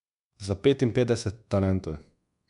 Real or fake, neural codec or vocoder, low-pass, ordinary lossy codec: fake; codec, 24 kHz, 1.2 kbps, DualCodec; 10.8 kHz; MP3, 96 kbps